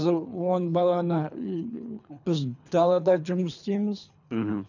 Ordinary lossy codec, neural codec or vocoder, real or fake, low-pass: none; codec, 24 kHz, 3 kbps, HILCodec; fake; 7.2 kHz